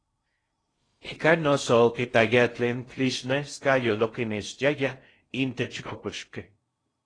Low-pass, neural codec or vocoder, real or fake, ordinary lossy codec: 9.9 kHz; codec, 16 kHz in and 24 kHz out, 0.6 kbps, FocalCodec, streaming, 2048 codes; fake; AAC, 32 kbps